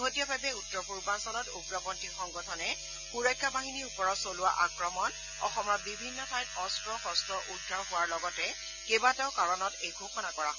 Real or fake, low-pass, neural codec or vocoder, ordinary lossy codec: real; 7.2 kHz; none; none